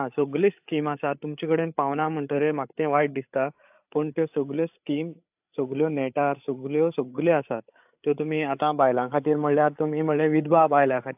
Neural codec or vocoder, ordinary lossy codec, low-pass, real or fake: codec, 16 kHz, 8 kbps, FreqCodec, larger model; AAC, 32 kbps; 3.6 kHz; fake